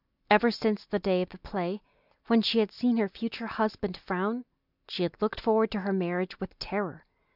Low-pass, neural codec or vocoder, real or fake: 5.4 kHz; none; real